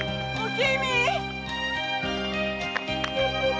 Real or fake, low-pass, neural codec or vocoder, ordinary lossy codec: real; none; none; none